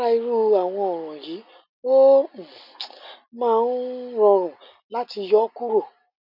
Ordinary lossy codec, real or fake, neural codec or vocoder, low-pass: none; real; none; 5.4 kHz